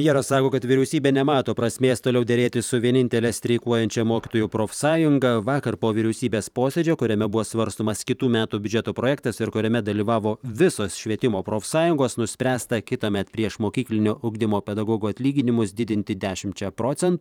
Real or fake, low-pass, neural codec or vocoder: fake; 19.8 kHz; vocoder, 44.1 kHz, 128 mel bands every 512 samples, BigVGAN v2